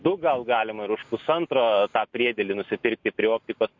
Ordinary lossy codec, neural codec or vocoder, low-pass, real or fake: MP3, 48 kbps; none; 7.2 kHz; real